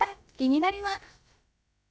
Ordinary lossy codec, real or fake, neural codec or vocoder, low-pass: none; fake; codec, 16 kHz, about 1 kbps, DyCAST, with the encoder's durations; none